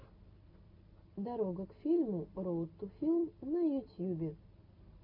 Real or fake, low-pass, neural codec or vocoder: real; 5.4 kHz; none